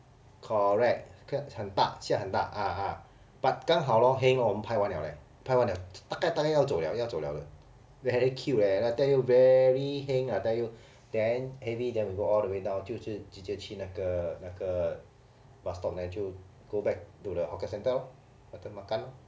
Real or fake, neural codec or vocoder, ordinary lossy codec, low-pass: real; none; none; none